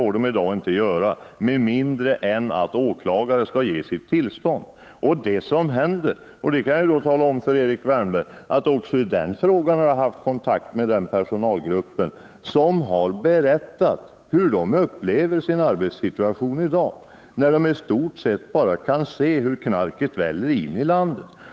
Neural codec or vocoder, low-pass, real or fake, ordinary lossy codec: codec, 16 kHz, 8 kbps, FunCodec, trained on Chinese and English, 25 frames a second; none; fake; none